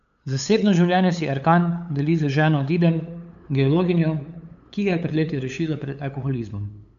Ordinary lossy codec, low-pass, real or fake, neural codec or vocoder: none; 7.2 kHz; fake; codec, 16 kHz, 8 kbps, FunCodec, trained on LibriTTS, 25 frames a second